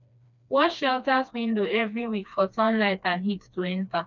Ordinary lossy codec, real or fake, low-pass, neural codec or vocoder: none; fake; 7.2 kHz; codec, 16 kHz, 2 kbps, FreqCodec, smaller model